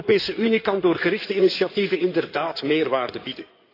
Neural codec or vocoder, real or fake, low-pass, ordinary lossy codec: codec, 16 kHz in and 24 kHz out, 2.2 kbps, FireRedTTS-2 codec; fake; 5.4 kHz; AAC, 32 kbps